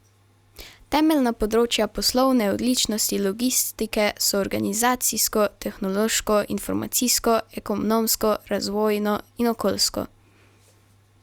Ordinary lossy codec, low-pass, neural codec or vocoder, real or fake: Opus, 64 kbps; 19.8 kHz; none; real